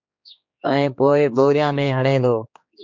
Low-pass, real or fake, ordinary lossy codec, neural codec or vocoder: 7.2 kHz; fake; MP3, 48 kbps; codec, 16 kHz, 2 kbps, X-Codec, HuBERT features, trained on general audio